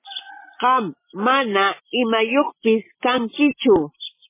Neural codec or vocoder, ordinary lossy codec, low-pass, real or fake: none; MP3, 16 kbps; 3.6 kHz; real